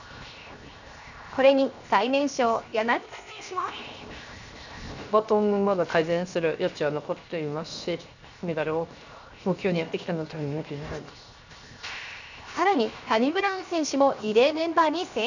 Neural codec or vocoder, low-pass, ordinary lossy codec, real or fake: codec, 16 kHz, 0.7 kbps, FocalCodec; 7.2 kHz; none; fake